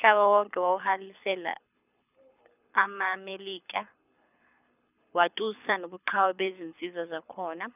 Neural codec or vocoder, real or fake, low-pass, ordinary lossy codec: codec, 24 kHz, 6 kbps, HILCodec; fake; 3.6 kHz; none